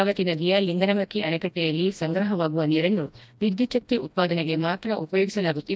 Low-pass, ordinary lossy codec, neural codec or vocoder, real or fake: none; none; codec, 16 kHz, 1 kbps, FreqCodec, smaller model; fake